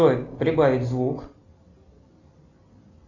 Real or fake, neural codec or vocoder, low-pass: real; none; 7.2 kHz